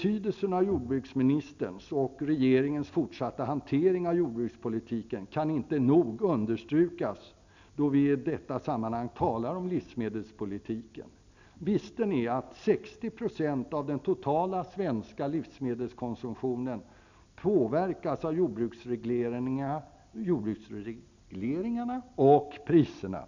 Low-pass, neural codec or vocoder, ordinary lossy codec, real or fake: 7.2 kHz; none; none; real